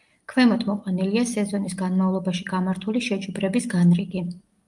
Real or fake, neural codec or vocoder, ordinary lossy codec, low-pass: real; none; Opus, 32 kbps; 10.8 kHz